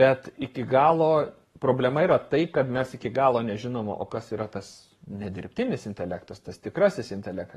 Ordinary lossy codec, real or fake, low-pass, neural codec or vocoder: AAC, 32 kbps; fake; 19.8 kHz; codec, 44.1 kHz, 7.8 kbps, Pupu-Codec